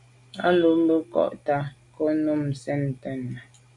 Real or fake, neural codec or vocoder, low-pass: real; none; 10.8 kHz